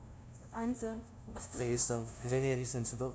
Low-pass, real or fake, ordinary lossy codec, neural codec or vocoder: none; fake; none; codec, 16 kHz, 0.5 kbps, FunCodec, trained on LibriTTS, 25 frames a second